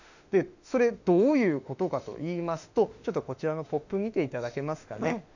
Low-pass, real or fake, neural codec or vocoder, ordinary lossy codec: 7.2 kHz; fake; autoencoder, 48 kHz, 32 numbers a frame, DAC-VAE, trained on Japanese speech; none